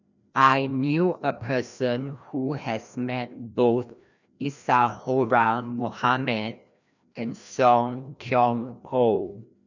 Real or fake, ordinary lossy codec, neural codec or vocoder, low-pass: fake; none; codec, 16 kHz, 1 kbps, FreqCodec, larger model; 7.2 kHz